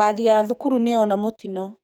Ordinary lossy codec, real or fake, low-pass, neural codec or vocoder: none; fake; none; codec, 44.1 kHz, 2.6 kbps, SNAC